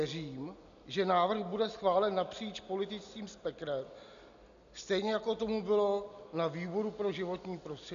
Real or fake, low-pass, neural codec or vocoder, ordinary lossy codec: real; 7.2 kHz; none; MP3, 96 kbps